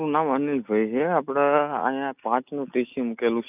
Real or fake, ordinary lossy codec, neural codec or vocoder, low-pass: real; none; none; 3.6 kHz